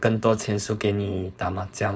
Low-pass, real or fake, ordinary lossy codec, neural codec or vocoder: none; fake; none; codec, 16 kHz, 4.8 kbps, FACodec